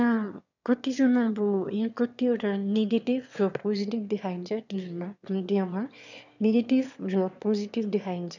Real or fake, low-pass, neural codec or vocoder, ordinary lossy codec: fake; 7.2 kHz; autoencoder, 22.05 kHz, a latent of 192 numbers a frame, VITS, trained on one speaker; none